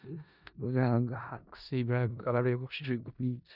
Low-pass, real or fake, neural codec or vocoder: 5.4 kHz; fake; codec, 16 kHz in and 24 kHz out, 0.4 kbps, LongCat-Audio-Codec, four codebook decoder